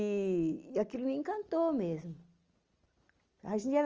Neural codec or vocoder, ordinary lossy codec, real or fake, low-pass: none; Opus, 24 kbps; real; 7.2 kHz